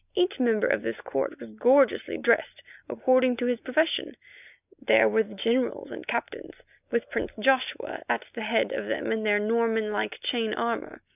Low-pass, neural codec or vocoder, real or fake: 3.6 kHz; none; real